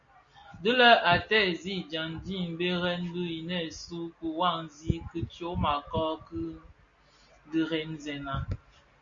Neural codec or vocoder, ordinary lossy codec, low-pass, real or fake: none; Opus, 64 kbps; 7.2 kHz; real